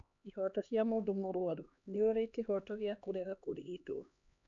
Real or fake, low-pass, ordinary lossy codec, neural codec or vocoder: fake; 7.2 kHz; none; codec, 16 kHz, 2 kbps, X-Codec, HuBERT features, trained on LibriSpeech